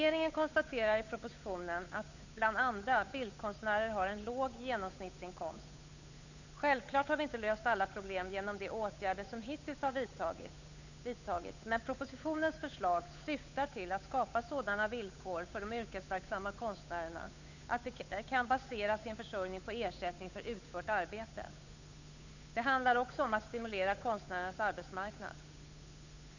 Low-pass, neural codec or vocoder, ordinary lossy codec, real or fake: 7.2 kHz; codec, 16 kHz, 8 kbps, FunCodec, trained on Chinese and English, 25 frames a second; none; fake